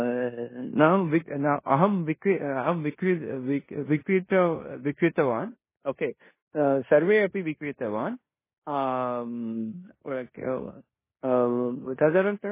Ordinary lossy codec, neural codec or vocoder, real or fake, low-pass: MP3, 16 kbps; codec, 16 kHz in and 24 kHz out, 0.9 kbps, LongCat-Audio-Codec, four codebook decoder; fake; 3.6 kHz